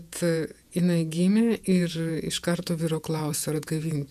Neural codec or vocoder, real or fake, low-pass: vocoder, 48 kHz, 128 mel bands, Vocos; fake; 14.4 kHz